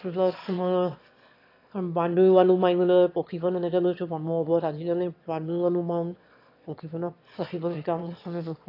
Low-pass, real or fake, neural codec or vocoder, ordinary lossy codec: 5.4 kHz; fake; autoencoder, 22.05 kHz, a latent of 192 numbers a frame, VITS, trained on one speaker; AAC, 32 kbps